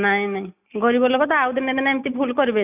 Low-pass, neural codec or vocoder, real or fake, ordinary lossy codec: 3.6 kHz; none; real; none